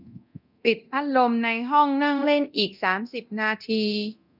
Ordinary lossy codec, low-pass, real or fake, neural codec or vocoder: none; 5.4 kHz; fake; codec, 24 kHz, 0.9 kbps, DualCodec